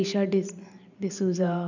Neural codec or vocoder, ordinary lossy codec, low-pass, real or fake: vocoder, 44.1 kHz, 128 mel bands every 512 samples, BigVGAN v2; none; 7.2 kHz; fake